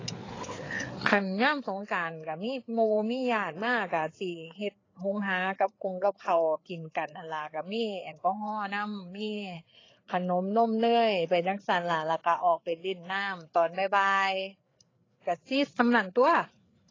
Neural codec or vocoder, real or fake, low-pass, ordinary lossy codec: codec, 16 kHz, 4 kbps, FreqCodec, larger model; fake; 7.2 kHz; AAC, 32 kbps